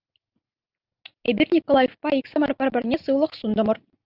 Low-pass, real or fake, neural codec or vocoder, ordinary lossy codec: 5.4 kHz; real; none; Opus, 24 kbps